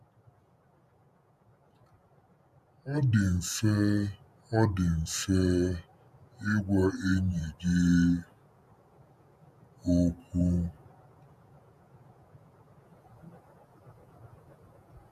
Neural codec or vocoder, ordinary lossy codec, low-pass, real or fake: vocoder, 48 kHz, 128 mel bands, Vocos; none; 14.4 kHz; fake